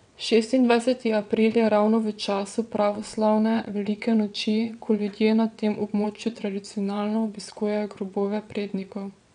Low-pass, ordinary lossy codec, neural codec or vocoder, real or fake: 9.9 kHz; none; vocoder, 22.05 kHz, 80 mel bands, WaveNeXt; fake